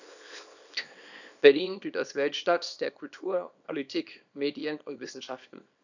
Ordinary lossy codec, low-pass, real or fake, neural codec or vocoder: none; 7.2 kHz; fake; codec, 24 kHz, 0.9 kbps, WavTokenizer, small release